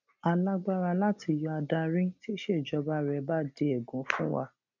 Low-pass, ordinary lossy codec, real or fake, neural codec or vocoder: 7.2 kHz; none; real; none